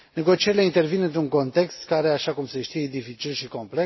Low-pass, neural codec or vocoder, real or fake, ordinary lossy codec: 7.2 kHz; none; real; MP3, 24 kbps